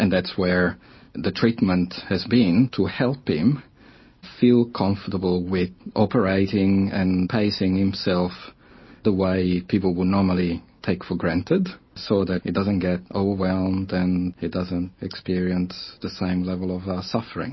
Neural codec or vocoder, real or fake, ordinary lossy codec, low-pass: none; real; MP3, 24 kbps; 7.2 kHz